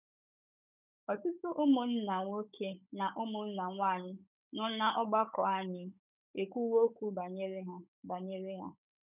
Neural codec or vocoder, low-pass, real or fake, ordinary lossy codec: codec, 16 kHz, 16 kbps, FunCodec, trained on Chinese and English, 50 frames a second; 3.6 kHz; fake; none